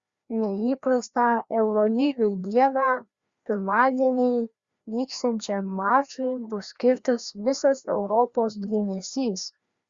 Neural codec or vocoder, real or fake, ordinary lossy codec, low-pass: codec, 16 kHz, 1 kbps, FreqCodec, larger model; fake; Opus, 64 kbps; 7.2 kHz